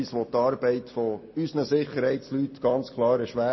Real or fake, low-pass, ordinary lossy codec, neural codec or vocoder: real; 7.2 kHz; MP3, 24 kbps; none